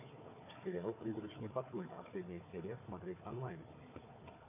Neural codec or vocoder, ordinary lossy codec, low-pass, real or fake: codec, 16 kHz, 4 kbps, FunCodec, trained on Chinese and English, 50 frames a second; MP3, 24 kbps; 3.6 kHz; fake